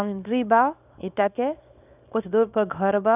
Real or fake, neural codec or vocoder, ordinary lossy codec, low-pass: fake; codec, 24 kHz, 0.9 kbps, WavTokenizer, small release; none; 3.6 kHz